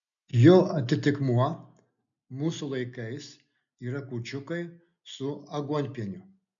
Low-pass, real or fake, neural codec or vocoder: 7.2 kHz; real; none